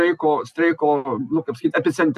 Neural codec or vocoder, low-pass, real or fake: none; 14.4 kHz; real